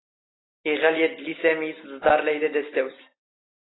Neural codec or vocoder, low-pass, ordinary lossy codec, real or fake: none; 7.2 kHz; AAC, 16 kbps; real